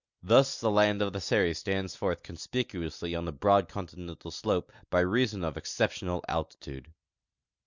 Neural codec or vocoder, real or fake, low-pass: none; real; 7.2 kHz